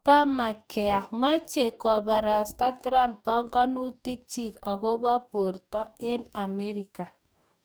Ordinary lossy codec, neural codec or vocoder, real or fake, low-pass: none; codec, 44.1 kHz, 2.6 kbps, DAC; fake; none